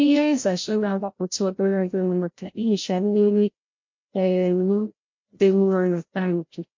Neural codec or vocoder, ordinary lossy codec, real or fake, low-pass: codec, 16 kHz, 0.5 kbps, FreqCodec, larger model; MP3, 48 kbps; fake; 7.2 kHz